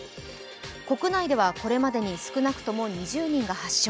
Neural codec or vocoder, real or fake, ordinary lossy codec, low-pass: none; real; none; none